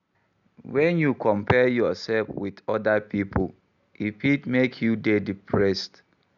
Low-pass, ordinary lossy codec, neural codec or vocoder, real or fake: 7.2 kHz; none; none; real